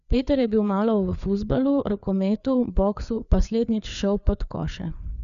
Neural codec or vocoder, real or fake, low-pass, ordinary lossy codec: codec, 16 kHz, 4 kbps, FreqCodec, larger model; fake; 7.2 kHz; none